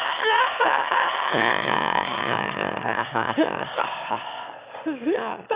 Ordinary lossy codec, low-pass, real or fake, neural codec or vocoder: Opus, 64 kbps; 3.6 kHz; fake; autoencoder, 22.05 kHz, a latent of 192 numbers a frame, VITS, trained on one speaker